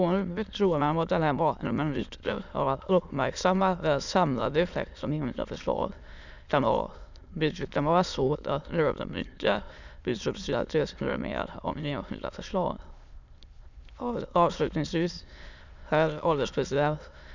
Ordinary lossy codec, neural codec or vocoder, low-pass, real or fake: none; autoencoder, 22.05 kHz, a latent of 192 numbers a frame, VITS, trained on many speakers; 7.2 kHz; fake